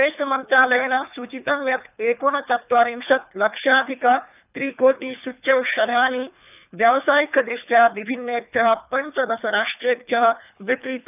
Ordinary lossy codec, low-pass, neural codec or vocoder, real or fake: none; 3.6 kHz; codec, 24 kHz, 3 kbps, HILCodec; fake